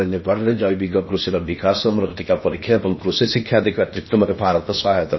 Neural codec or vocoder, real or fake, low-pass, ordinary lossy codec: codec, 16 kHz in and 24 kHz out, 0.8 kbps, FocalCodec, streaming, 65536 codes; fake; 7.2 kHz; MP3, 24 kbps